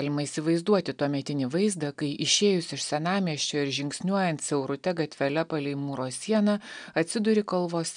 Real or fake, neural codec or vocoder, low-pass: real; none; 9.9 kHz